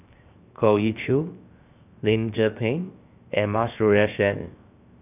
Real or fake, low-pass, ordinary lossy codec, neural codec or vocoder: fake; 3.6 kHz; none; codec, 16 kHz, 0.7 kbps, FocalCodec